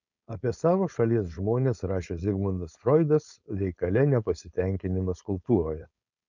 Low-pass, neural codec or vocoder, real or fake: 7.2 kHz; codec, 16 kHz, 4.8 kbps, FACodec; fake